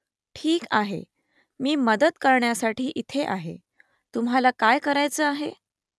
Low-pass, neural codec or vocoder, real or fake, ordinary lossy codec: none; none; real; none